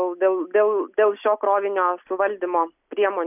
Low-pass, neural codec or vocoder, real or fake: 3.6 kHz; none; real